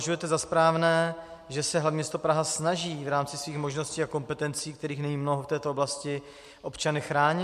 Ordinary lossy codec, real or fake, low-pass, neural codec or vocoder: MP3, 64 kbps; real; 14.4 kHz; none